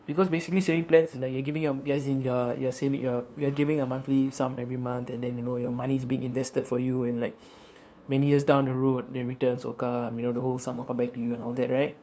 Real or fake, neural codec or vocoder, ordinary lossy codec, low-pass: fake; codec, 16 kHz, 2 kbps, FunCodec, trained on LibriTTS, 25 frames a second; none; none